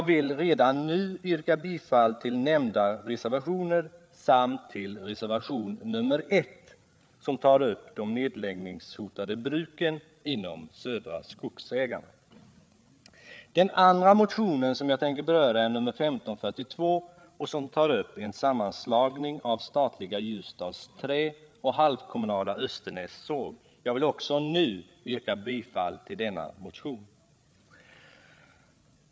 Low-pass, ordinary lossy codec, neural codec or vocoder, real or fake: none; none; codec, 16 kHz, 8 kbps, FreqCodec, larger model; fake